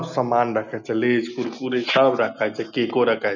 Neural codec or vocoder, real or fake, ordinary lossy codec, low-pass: none; real; none; 7.2 kHz